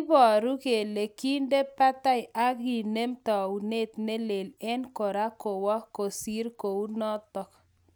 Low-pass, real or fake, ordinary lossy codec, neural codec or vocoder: none; real; none; none